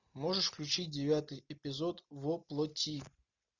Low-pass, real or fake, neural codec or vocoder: 7.2 kHz; real; none